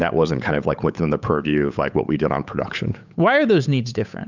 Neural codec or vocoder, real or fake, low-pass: codec, 16 kHz, 8 kbps, FunCodec, trained on Chinese and English, 25 frames a second; fake; 7.2 kHz